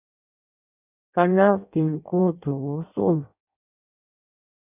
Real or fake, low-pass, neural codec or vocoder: fake; 3.6 kHz; codec, 16 kHz in and 24 kHz out, 0.6 kbps, FireRedTTS-2 codec